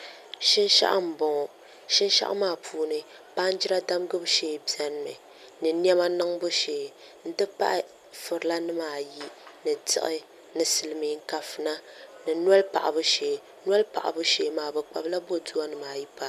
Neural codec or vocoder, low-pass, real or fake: none; 14.4 kHz; real